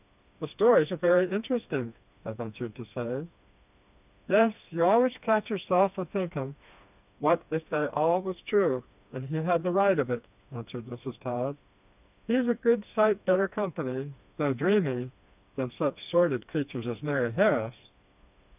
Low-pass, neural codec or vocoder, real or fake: 3.6 kHz; codec, 16 kHz, 2 kbps, FreqCodec, smaller model; fake